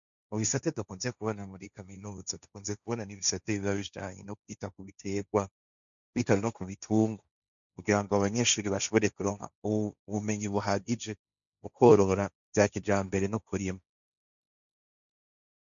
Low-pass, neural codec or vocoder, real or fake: 7.2 kHz; codec, 16 kHz, 1.1 kbps, Voila-Tokenizer; fake